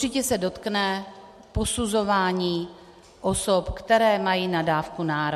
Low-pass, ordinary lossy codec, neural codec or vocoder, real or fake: 14.4 kHz; MP3, 64 kbps; none; real